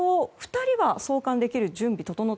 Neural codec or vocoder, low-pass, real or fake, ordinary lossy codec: none; none; real; none